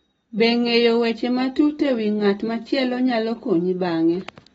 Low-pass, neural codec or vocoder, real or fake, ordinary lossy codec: 19.8 kHz; none; real; AAC, 24 kbps